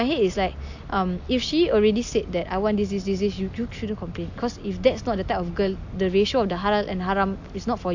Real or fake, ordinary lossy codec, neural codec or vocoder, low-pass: real; AAC, 48 kbps; none; 7.2 kHz